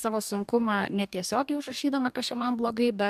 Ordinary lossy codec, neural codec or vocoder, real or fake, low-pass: AAC, 96 kbps; codec, 44.1 kHz, 2.6 kbps, DAC; fake; 14.4 kHz